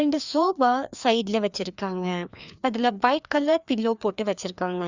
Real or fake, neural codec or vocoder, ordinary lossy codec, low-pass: fake; codec, 16 kHz, 2 kbps, FreqCodec, larger model; Opus, 64 kbps; 7.2 kHz